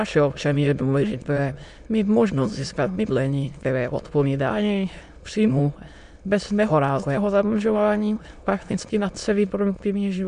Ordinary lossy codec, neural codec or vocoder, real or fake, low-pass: MP3, 64 kbps; autoencoder, 22.05 kHz, a latent of 192 numbers a frame, VITS, trained on many speakers; fake; 9.9 kHz